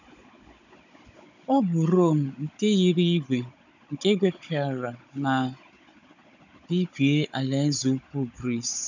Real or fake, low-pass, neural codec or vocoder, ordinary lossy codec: fake; 7.2 kHz; codec, 16 kHz, 16 kbps, FunCodec, trained on Chinese and English, 50 frames a second; none